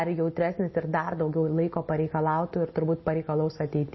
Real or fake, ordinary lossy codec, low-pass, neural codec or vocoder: real; MP3, 24 kbps; 7.2 kHz; none